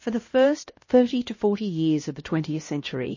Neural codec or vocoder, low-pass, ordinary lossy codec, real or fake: codec, 16 kHz, 1 kbps, X-Codec, HuBERT features, trained on LibriSpeech; 7.2 kHz; MP3, 32 kbps; fake